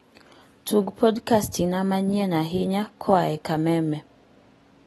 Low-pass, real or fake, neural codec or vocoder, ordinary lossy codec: 19.8 kHz; real; none; AAC, 32 kbps